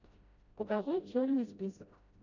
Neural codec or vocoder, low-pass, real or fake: codec, 16 kHz, 0.5 kbps, FreqCodec, smaller model; 7.2 kHz; fake